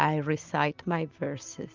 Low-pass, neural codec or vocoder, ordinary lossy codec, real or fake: 7.2 kHz; none; Opus, 24 kbps; real